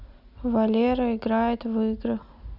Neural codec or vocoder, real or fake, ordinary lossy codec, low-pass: none; real; none; 5.4 kHz